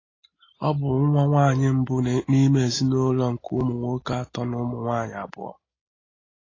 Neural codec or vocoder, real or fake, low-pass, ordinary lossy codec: none; real; 7.2 kHz; AAC, 32 kbps